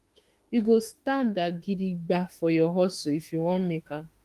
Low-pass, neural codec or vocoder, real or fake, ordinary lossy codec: 14.4 kHz; autoencoder, 48 kHz, 32 numbers a frame, DAC-VAE, trained on Japanese speech; fake; Opus, 24 kbps